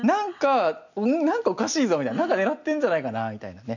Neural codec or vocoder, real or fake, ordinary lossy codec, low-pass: none; real; none; 7.2 kHz